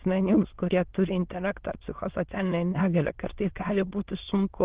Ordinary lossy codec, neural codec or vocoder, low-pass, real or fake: Opus, 16 kbps; autoencoder, 22.05 kHz, a latent of 192 numbers a frame, VITS, trained on many speakers; 3.6 kHz; fake